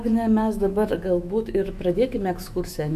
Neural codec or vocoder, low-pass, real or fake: autoencoder, 48 kHz, 128 numbers a frame, DAC-VAE, trained on Japanese speech; 14.4 kHz; fake